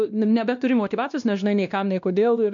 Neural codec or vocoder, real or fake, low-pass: codec, 16 kHz, 1 kbps, X-Codec, WavLM features, trained on Multilingual LibriSpeech; fake; 7.2 kHz